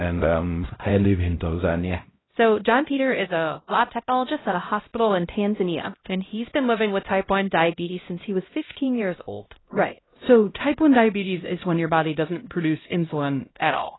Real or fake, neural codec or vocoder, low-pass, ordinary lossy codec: fake; codec, 16 kHz, 0.5 kbps, X-Codec, HuBERT features, trained on LibriSpeech; 7.2 kHz; AAC, 16 kbps